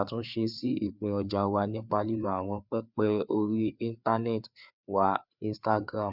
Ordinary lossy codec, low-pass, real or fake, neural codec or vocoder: none; 5.4 kHz; fake; codec, 16 kHz, 4 kbps, FreqCodec, larger model